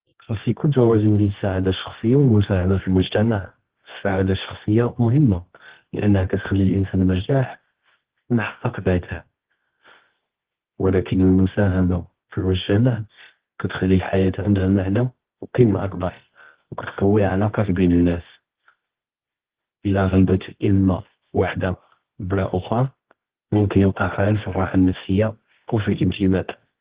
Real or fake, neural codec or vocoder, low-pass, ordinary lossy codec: fake; codec, 24 kHz, 0.9 kbps, WavTokenizer, medium music audio release; 3.6 kHz; Opus, 16 kbps